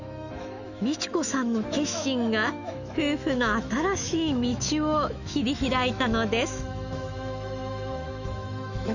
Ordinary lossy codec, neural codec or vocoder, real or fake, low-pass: none; autoencoder, 48 kHz, 128 numbers a frame, DAC-VAE, trained on Japanese speech; fake; 7.2 kHz